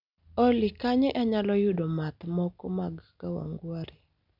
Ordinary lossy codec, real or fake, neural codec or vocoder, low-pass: none; real; none; 5.4 kHz